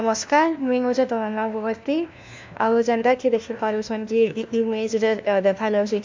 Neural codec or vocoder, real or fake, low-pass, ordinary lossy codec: codec, 16 kHz, 1 kbps, FunCodec, trained on LibriTTS, 50 frames a second; fake; 7.2 kHz; MP3, 64 kbps